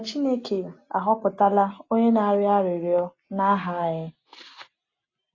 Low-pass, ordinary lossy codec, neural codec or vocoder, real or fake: 7.2 kHz; AAC, 32 kbps; none; real